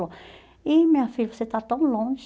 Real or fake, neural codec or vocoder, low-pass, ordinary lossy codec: real; none; none; none